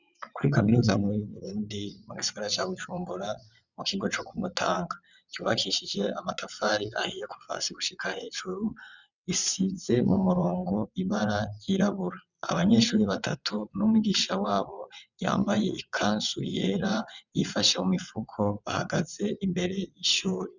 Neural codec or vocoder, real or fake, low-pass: vocoder, 22.05 kHz, 80 mel bands, WaveNeXt; fake; 7.2 kHz